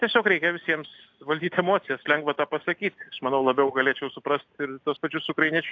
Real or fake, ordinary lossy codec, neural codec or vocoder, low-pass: real; AAC, 48 kbps; none; 7.2 kHz